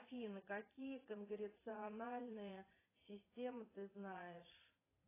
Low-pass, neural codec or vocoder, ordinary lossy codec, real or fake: 3.6 kHz; vocoder, 22.05 kHz, 80 mel bands, Vocos; AAC, 16 kbps; fake